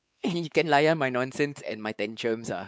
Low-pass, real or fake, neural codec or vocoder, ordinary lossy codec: none; fake; codec, 16 kHz, 4 kbps, X-Codec, WavLM features, trained on Multilingual LibriSpeech; none